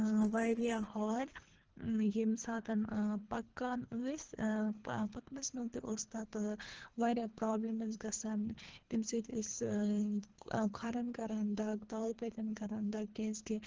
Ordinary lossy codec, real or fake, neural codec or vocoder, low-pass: Opus, 16 kbps; fake; codec, 24 kHz, 3 kbps, HILCodec; 7.2 kHz